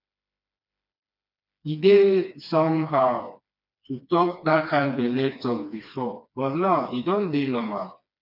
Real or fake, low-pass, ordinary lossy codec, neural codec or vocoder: fake; 5.4 kHz; none; codec, 16 kHz, 2 kbps, FreqCodec, smaller model